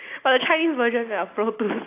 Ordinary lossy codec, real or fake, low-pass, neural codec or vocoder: AAC, 24 kbps; real; 3.6 kHz; none